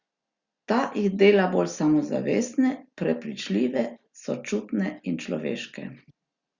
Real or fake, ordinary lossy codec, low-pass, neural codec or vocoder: real; Opus, 64 kbps; 7.2 kHz; none